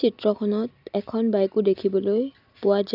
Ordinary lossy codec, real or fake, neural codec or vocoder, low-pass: none; real; none; 5.4 kHz